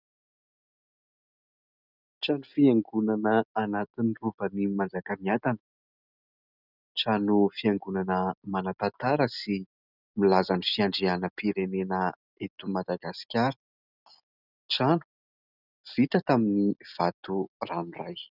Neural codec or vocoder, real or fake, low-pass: none; real; 5.4 kHz